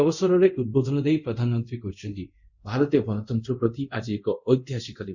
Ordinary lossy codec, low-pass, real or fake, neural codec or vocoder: Opus, 64 kbps; 7.2 kHz; fake; codec, 24 kHz, 0.5 kbps, DualCodec